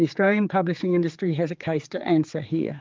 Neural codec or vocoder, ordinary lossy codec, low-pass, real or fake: codec, 16 kHz, 4 kbps, X-Codec, HuBERT features, trained on general audio; Opus, 24 kbps; 7.2 kHz; fake